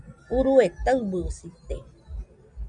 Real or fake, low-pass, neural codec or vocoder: real; 9.9 kHz; none